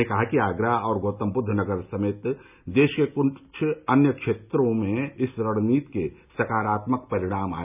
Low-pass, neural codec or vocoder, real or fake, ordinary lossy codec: 3.6 kHz; none; real; none